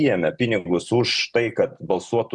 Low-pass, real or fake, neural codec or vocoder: 10.8 kHz; real; none